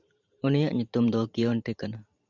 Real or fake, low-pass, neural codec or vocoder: fake; 7.2 kHz; vocoder, 44.1 kHz, 128 mel bands every 512 samples, BigVGAN v2